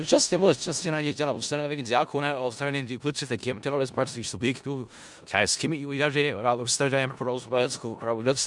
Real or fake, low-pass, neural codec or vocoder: fake; 10.8 kHz; codec, 16 kHz in and 24 kHz out, 0.4 kbps, LongCat-Audio-Codec, four codebook decoder